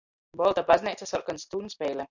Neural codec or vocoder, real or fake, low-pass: none; real; 7.2 kHz